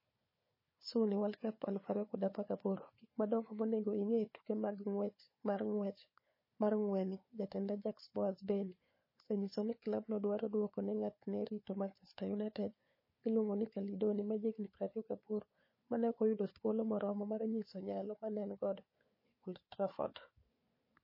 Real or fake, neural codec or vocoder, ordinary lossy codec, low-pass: fake; codec, 16 kHz, 4 kbps, FunCodec, trained on Chinese and English, 50 frames a second; MP3, 24 kbps; 5.4 kHz